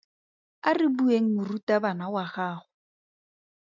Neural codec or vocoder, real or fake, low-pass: none; real; 7.2 kHz